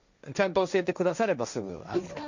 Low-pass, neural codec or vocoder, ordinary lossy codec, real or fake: 7.2 kHz; codec, 16 kHz, 1.1 kbps, Voila-Tokenizer; none; fake